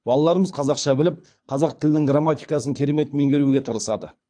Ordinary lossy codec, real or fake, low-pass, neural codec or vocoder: AAC, 64 kbps; fake; 9.9 kHz; codec, 24 kHz, 3 kbps, HILCodec